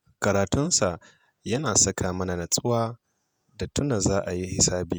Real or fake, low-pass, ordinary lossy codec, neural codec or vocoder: fake; none; none; vocoder, 48 kHz, 128 mel bands, Vocos